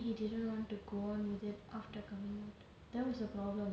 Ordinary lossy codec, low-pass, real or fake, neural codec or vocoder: none; none; real; none